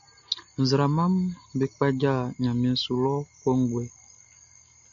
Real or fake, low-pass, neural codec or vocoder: real; 7.2 kHz; none